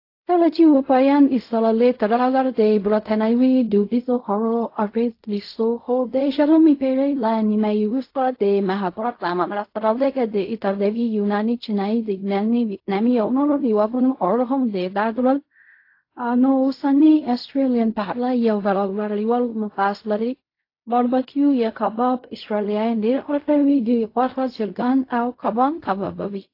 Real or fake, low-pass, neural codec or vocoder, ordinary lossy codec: fake; 5.4 kHz; codec, 16 kHz in and 24 kHz out, 0.4 kbps, LongCat-Audio-Codec, fine tuned four codebook decoder; AAC, 32 kbps